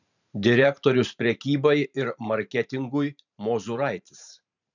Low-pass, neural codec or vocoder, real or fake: 7.2 kHz; vocoder, 44.1 kHz, 128 mel bands every 512 samples, BigVGAN v2; fake